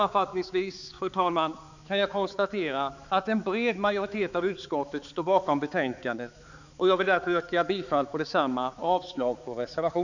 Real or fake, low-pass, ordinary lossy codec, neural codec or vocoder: fake; 7.2 kHz; none; codec, 16 kHz, 4 kbps, X-Codec, HuBERT features, trained on balanced general audio